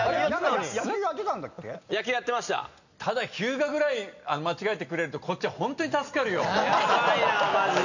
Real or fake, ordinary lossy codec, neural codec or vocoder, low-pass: real; none; none; 7.2 kHz